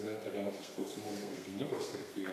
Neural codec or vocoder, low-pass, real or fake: codec, 32 kHz, 1.9 kbps, SNAC; 14.4 kHz; fake